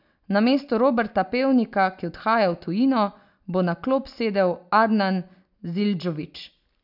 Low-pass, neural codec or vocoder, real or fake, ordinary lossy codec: 5.4 kHz; none; real; none